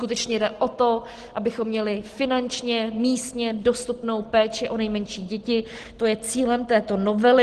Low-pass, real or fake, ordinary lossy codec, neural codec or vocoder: 10.8 kHz; real; Opus, 16 kbps; none